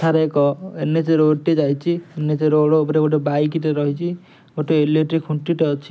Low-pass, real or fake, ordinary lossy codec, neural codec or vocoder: none; real; none; none